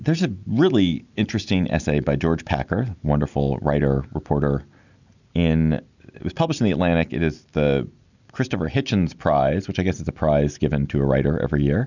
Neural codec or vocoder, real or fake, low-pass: none; real; 7.2 kHz